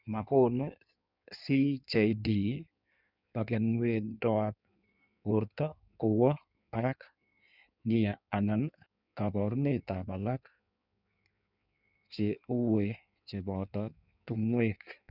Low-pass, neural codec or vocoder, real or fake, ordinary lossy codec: 5.4 kHz; codec, 16 kHz in and 24 kHz out, 1.1 kbps, FireRedTTS-2 codec; fake; none